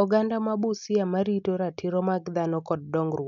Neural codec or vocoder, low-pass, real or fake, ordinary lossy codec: none; 7.2 kHz; real; none